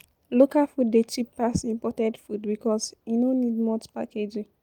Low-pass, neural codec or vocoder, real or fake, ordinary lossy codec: 19.8 kHz; none; real; Opus, 32 kbps